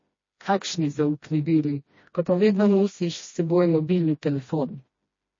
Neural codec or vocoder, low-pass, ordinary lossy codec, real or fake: codec, 16 kHz, 1 kbps, FreqCodec, smaller model; 7.2 kHz; MP3, 32 kbps; fake